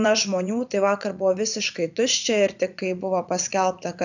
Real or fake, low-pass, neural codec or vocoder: fake; 7.2 kHz; vocoder, 44.1 kHz, 80 mel bands, Vocos